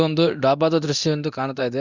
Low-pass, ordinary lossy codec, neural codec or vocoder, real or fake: 7.2 kHz; Opus, 64 kbps; codec, 24 kHz, 0.9 kbps, DualCodec; fake